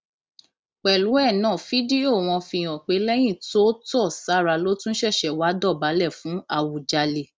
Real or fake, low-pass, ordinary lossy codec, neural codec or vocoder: real; none; none; none